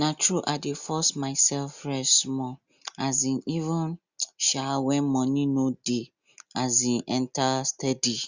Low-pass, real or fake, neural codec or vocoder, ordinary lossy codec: 7.2 kHz; real; none; Opus, 64 kbps